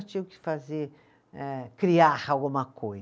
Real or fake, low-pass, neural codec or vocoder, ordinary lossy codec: real; none; none; none